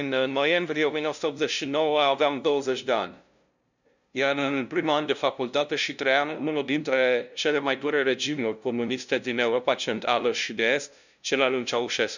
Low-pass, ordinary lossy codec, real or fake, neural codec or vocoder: 7.2 kHz; none; fake; codec, 16 kHz, 0.5 kbps, FunCodec, trained on LibriTTS, 25 frames a second